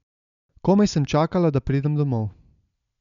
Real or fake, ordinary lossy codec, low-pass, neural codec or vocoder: real; none; 7.2 kHz; none